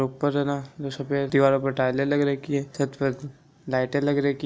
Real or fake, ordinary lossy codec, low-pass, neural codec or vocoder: real; none; none; none